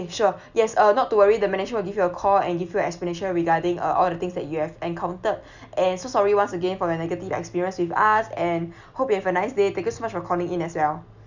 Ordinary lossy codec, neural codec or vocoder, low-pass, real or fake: none; none; 7.2 kHz; real